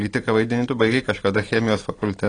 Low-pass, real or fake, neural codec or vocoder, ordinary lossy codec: 9.9 kHz; real; none; AAC, 32 kbps